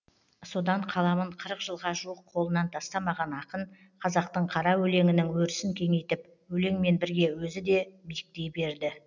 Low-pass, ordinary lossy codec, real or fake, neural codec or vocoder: 7.2 kHz; none; real; none